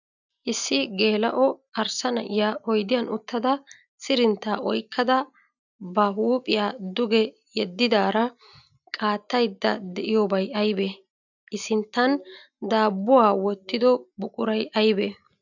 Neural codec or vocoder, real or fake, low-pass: none; real; 7.2 kHz